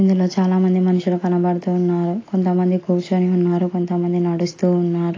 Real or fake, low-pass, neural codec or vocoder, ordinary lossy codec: real; 7.2 kHz; none; AAC, 32 kbps